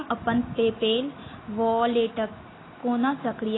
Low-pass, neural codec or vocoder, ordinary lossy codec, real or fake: 7.2 kHz; none; AAC, 16 kbps; real